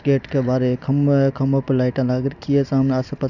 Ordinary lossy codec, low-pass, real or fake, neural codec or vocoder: none; 7.2 kHz; real; none